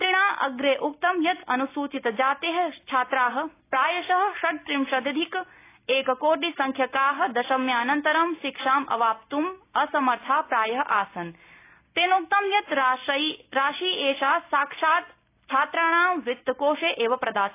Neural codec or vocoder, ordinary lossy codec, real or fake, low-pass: none; AAC, 24 kbps; real; 3.6 kHz